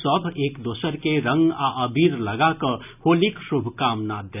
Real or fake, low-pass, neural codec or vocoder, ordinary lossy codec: real; 3.6 kHz; none; none